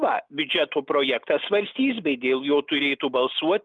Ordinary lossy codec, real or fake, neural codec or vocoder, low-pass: Opus, 32 kbps; real; none; 9.9 kHz